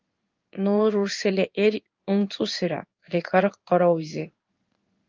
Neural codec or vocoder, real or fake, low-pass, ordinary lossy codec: codec, 16 kHz in and 24 kHz out, 1 kbps, XY-Tokenizer; fake; 7.2 kHz; Opus, 32 kbps